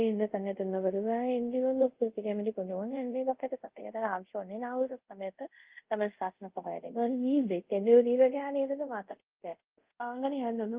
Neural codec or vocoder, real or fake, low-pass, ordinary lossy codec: codec, 24 kHz, 0.5 kbps, DualCodec; fake; 3.6 kHz; Opus, 32 kbps